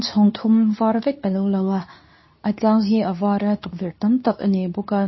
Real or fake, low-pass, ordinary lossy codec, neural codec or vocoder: fake; 7.2 kHz; MP3, 24 kbps; codec, 24 kHz, 0.9 kbps, WavTokenizer, medium speech release version 2